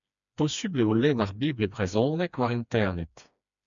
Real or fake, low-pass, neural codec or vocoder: fake; 7.2 kHz; codec, 16 kHz, 2 kbps, FreqCodec, smaller model